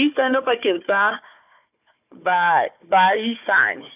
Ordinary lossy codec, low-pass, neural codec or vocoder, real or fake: AAC, 32 kbps; 3.6 kHz; codec, 16 kHz, 4 kbps, FunCodec, trained on Chinese and English, 50 frames a second; fake